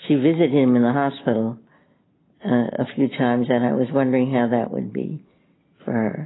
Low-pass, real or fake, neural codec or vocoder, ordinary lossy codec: 7.2 kHz; real; none; AAC, 16 kbps